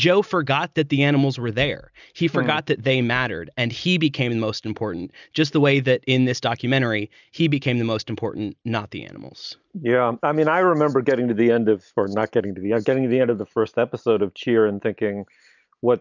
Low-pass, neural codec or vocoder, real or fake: 7.2 kHz; none; real